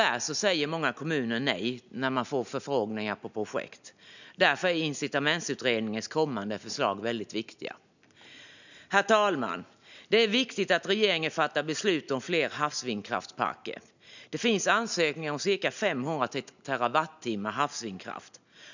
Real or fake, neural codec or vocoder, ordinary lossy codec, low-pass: real; none; none; 7.2 kHz